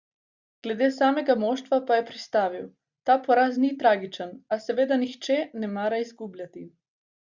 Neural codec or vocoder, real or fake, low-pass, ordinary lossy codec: none; real; 7.2 kHz; Opus, 64 kbps